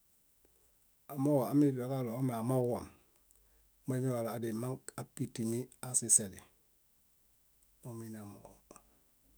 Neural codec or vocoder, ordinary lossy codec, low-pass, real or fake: autoencoder, 48 kHz, 128 numbers a frame, DAC-VAE, trained on Japanese speech; none; none; fake